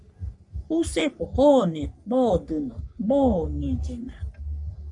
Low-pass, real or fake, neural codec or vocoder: 10.8 kHz; fake; codec, 44.1 kHz, 3.4 kbps, Pupu-Codec